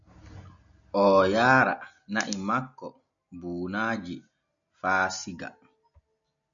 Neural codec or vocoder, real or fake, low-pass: none; real; 7.2 kHz